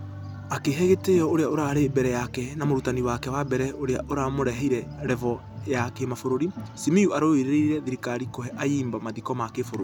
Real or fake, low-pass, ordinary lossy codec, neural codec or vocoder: real; 19.8 kHz; none; none